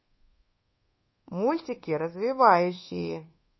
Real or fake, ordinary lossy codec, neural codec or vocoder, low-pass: fake; MP3, 24 kbps; codec, 24 kHz, 1.2 kbps, DualCodec; 7.2 kHz